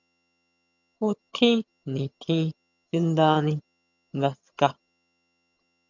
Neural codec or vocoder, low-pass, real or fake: vocoder, 22.05 kHz, 80 mel bands, HiFi-GAN; 7.2 kHz; fake